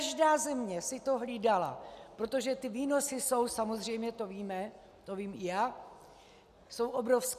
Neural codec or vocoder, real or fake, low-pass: none; real; 14.4 kHz